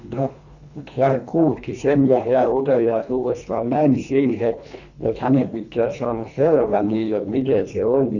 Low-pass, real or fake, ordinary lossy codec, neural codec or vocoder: 7.2 kHz; fake; none; codec, 24 kHz, 1.5 kbps, HILCodec